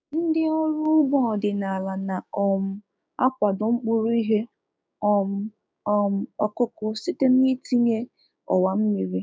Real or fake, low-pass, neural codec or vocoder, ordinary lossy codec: fake; none; codec, 16 kHz, 6 kbps, DAC; none